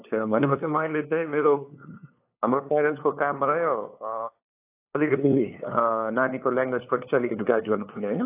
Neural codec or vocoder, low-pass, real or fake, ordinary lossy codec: codec, 16 kHz, 2 kbps, FunCodec, trained on LibriTTS, 25 frames a second; 3.6 kHz; fake; AAC, 24 kbps